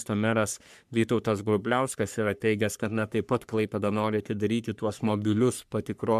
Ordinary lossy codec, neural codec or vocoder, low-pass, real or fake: MP3, 96 kbps; codec, 44.1 kHz, 3.4 kbps, Pupu-Codec; 14.4 kHz; fake